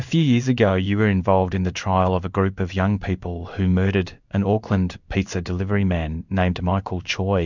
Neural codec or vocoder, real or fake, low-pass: codec, 16 kHz in and 24 kHz out, 1 kbps, XY-Tokenizer; fake; 7.2 kHz